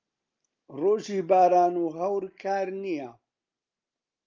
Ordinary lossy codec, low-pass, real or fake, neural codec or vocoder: Opus, 24 kbps; 7.2 kHz; real; none